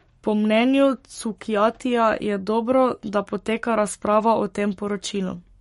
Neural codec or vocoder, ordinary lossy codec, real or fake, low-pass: codec, 44.1 kHz, 7.8 kbps, Pupu-Codec; MP3, 48 kbps; fake; 19.8 kHz